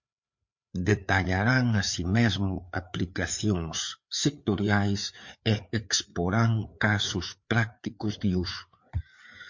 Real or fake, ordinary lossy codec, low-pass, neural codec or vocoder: fake; MP3, 48 kbps; 7.2 kHz; codec, 16 kHz, 4 kbps, FreqCodec, larger model